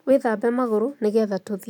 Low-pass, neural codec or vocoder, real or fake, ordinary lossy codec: 19.8 kHz; none; real; none